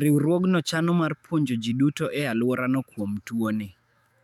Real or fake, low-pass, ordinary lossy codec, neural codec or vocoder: fake; 19.8 kHz; none; autoencoder, 48 kHz, 128 numbers a frame, DAC-VAE, trained on Japanese speech